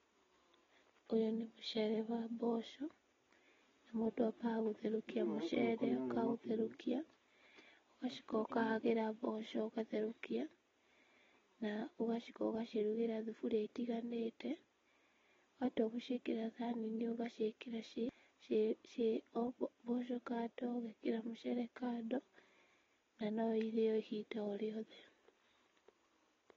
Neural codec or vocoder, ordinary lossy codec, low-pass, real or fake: none; AAC, 24 kbps; 7.2 kHz; real